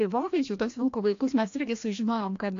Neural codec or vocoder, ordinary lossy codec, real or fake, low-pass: codec, 16 kHz, 1 kbps, FreqCodec, larger model; AAC, 48 kbps; fake; 7.2 kHz